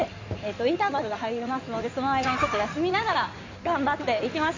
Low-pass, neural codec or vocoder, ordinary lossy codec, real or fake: 7.2 kHz; codec, 16 kHz in and 24 kHz out, 2.2 kbps, FireRedTTS-2 codec; MP3, 64 kbps; fake